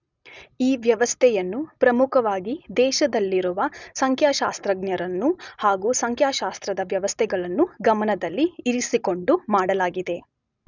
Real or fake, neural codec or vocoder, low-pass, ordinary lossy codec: real; none; 7.2 kHz; none